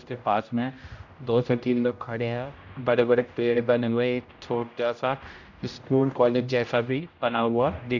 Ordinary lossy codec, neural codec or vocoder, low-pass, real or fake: none; codec, 16 kHz, 0.5 kbps, X-Codec, HuBERT features, trained on general audio; 7.2 kHz; fake